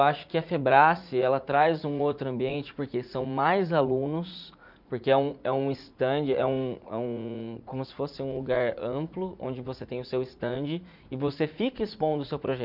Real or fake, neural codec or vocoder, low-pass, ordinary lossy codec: fake; vocoder, 44.1 kHz, 80 mel bands, Vocos; 5.4 kHz; none